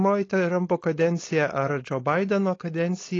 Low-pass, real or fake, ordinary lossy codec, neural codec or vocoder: 7.2 kHz; fake; AAC, 32 kbps; codec, 16 kHz, 4.8 kbps, FACodec